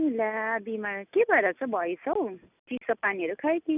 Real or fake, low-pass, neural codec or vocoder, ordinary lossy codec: real; 3.6 kHz; none; none